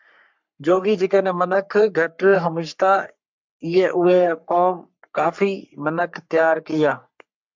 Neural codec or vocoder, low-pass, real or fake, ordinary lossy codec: codec, 44.1 kHz, 3.4 kbps, Pupu-Codec; 7.2 kHz; fake; MP3, 64 kbps